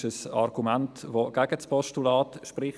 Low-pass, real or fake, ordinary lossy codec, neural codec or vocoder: none; real; none; none